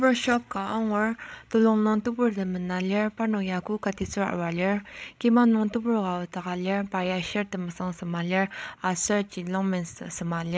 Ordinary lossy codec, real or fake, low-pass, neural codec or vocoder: none; fake; none; codec, 16 kHz, 16 kbps, FreqCodec, larger model